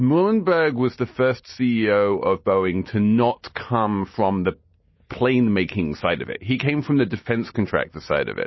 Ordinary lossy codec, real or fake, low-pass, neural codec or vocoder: MP3, 24 kbps; real; 7.2 kHz; none